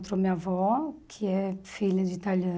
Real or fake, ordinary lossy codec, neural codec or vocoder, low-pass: real; none; none; none